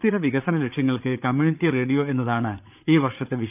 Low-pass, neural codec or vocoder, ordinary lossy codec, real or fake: 3.6 kHz; codec, 16 kHz, 8 kbps, FreqCodec, larger model; none; fake